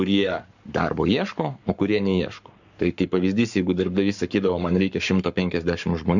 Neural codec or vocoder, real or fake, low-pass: codec, 44.1 kHz, 7.8 kbps, Pupu-Codec; fake; 7.2 kHz